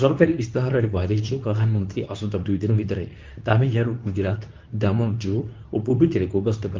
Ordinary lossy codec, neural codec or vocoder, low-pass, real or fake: Opus, 32 kbps; codec, 24 kHz, 0.9 kbps, WavTokenizer, medium speech release version 2; 7.2 kHz; fake